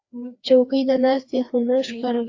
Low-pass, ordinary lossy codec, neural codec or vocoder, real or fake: 7.2 kHz; AAC, 48 kbps; codec, 16 kHz, 2 kbps, FreqCodec, larger model; fake